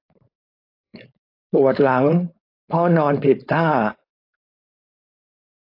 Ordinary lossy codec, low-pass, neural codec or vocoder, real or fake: AAC, 32 kbps; 5.4 kHz; codec, 16 kHz, 4.8 kbps, FACodec; fake